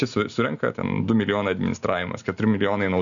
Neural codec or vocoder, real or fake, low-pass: none; real; 7.2 kHz